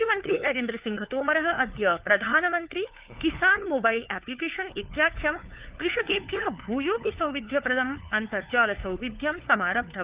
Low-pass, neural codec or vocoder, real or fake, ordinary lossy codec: 3.6 kHz; codec, 16 kHz, 4 kbps, FunCodec, trained on LibriTTS, 50 frames a second; fake; Opus, 32 kbps